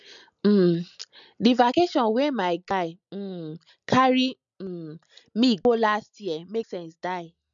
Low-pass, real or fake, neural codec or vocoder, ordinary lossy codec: 7.2 kHz; real; none; none